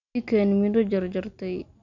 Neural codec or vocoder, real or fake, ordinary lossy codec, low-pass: none; real; none; 7.2 kHz